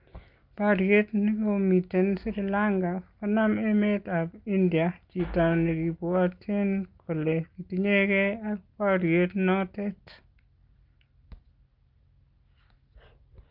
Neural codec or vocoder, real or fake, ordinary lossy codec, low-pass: none; real; Opus, 24 kbps; 5.4 kHz